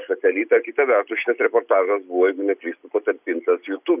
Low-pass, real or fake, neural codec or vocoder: 3.6 kHz; real; none